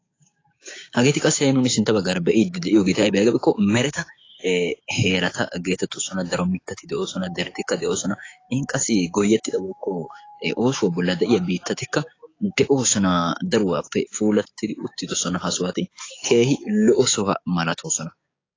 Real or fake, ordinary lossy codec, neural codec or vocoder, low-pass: fake; AAC, 32 kbps; codec, 16 kHz, 6 kbps, DAC; 7.2 kHz